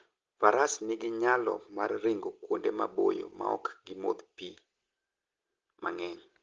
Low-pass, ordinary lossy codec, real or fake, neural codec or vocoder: 7.2 kHz; Opus, 16 kbps; real; none